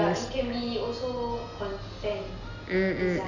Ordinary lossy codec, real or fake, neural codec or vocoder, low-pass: none; real; none; 7.2 kHz